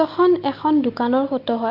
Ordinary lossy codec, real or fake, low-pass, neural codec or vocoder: Opus, 32 kbps; real; 5.4 kHz; none